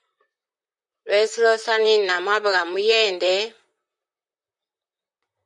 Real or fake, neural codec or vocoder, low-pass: fake; vocoder, 44.1 kHz, 128 mel bands, Pupu-Vocoder; 10.8 kHz